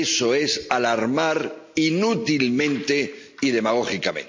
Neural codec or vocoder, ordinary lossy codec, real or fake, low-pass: none; MP3, 48 kbps; real; 7.2 kHz